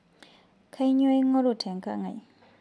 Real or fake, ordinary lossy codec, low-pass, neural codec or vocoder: real; none; none; none